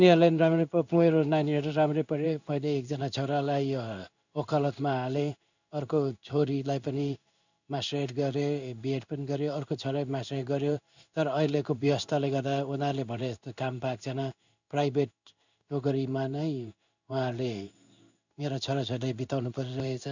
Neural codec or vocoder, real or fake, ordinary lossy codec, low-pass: codec, 16 kHz in and 24 kHz out, 1 kbps, XY-Tokenizer; fake; none; 7.2 kHz